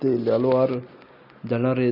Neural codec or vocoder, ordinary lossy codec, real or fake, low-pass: none; none; real; 5.4 kHz